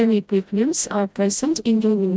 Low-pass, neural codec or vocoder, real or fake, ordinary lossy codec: none; codec, 16 kHz, 0.5 kbps, FreqCodec, smaller model; fake; none